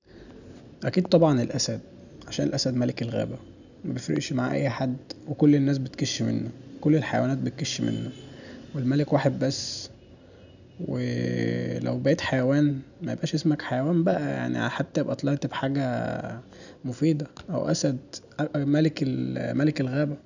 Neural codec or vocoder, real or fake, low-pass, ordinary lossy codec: none; real; 7.2 kHz; none